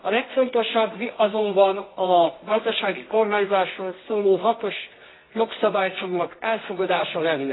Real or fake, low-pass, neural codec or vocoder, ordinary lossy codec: fake; 7.2 kHz; codec, 24 kHz, 0.9 kbps, WavTokenizer, medium music audio release; AAC, 16 kbps